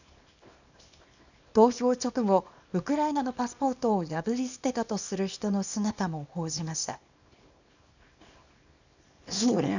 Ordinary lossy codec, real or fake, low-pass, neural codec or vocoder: none; fake; 7.2 kHz; codec, 24 kHz, 0.9 kbps, WavTokenizer, small release